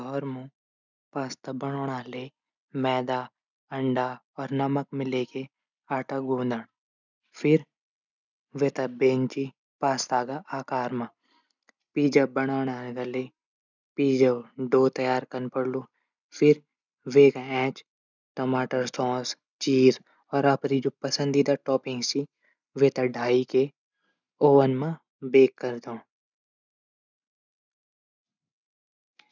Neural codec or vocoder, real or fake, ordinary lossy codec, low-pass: none; real; none; 7.2 kHz